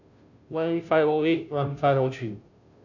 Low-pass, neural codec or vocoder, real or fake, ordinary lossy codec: 7.2 kHz; codec, 16 kHz, 0.5 kbps, FunCodec, trained on Chinese and English, 25 frames a second; fake; none